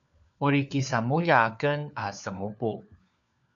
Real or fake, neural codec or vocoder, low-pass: fake; codec, 16 kHz, 4 kbps, FunCodec, trained on LibriTTS, 50 frames a second; 7.2 kHz